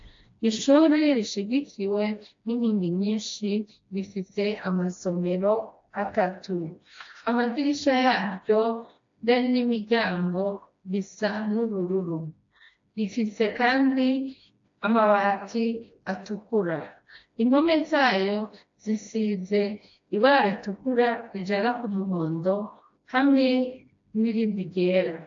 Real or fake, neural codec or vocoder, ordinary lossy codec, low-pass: fake; codec, 16 kHz, 1 kbps, FreqCodec, smaller model; AAC, 48 kbps; 7.2 kHz